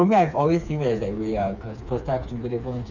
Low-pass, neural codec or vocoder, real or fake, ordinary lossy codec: 7.2 kHz; codec, 16 kHz, 4 kbps, FreqCodec, smaller model; fake; none